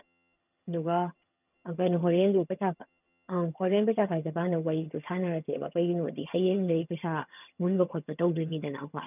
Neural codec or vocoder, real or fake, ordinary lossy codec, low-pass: vocoder, 22.05 kHz, 80 mel bands, HiFi-GAN; fake; none; 3.6 kHz